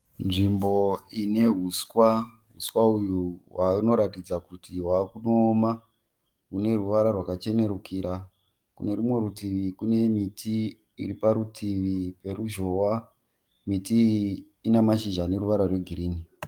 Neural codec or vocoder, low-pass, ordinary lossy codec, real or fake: codec, 44.1 kHz, 7.8 kbps, DAC; 19.8 kHz; Opus, 32 kbps; fake